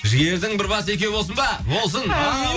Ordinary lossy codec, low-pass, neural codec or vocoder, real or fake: none; none; none; real